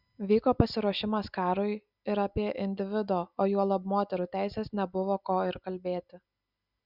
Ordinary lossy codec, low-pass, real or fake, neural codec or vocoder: Opus, 64 kbps; 5.4 kHz; real; none